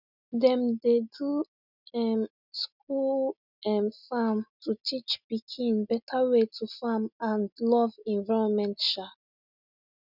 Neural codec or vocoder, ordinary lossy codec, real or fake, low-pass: none; none; real; 5.4 kHz